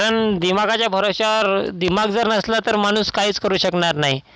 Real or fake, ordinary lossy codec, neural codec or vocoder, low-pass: real; none; none; none